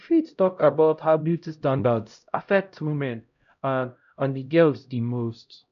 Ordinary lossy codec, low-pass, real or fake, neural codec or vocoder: none; 7.2 kHz; fake; codec, 16 kHz, 0.5 kbps, X-Codec, HuBERT features, trained on LibriSpeech